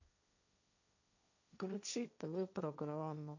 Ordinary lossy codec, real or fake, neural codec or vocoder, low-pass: none; fake; codec, 16 kHz, 1.1 kbps, Voila-Tokenizer; 7.2 kHz